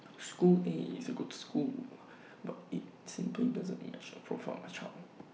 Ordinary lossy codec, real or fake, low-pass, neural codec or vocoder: none; real; none; none